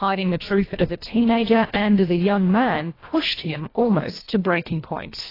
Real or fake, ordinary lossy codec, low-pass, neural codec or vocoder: fake; AAC, 24 kbps; 5.4 kHz; codec, 24 kHz, 1.5 kbps, HILCodec